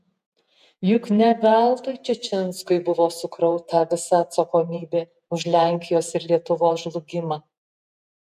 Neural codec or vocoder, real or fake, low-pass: vocoder, 48 kHz, 128 mel bands, Vocos; fake; 14.4 kHz